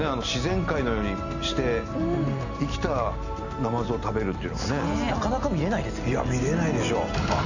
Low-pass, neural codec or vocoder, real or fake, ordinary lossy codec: 7.2 kHz; none; real; none